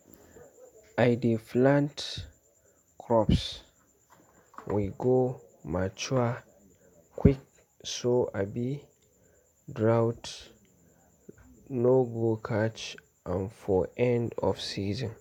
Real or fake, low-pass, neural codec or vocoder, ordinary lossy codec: real; 19.8 kHz; none; none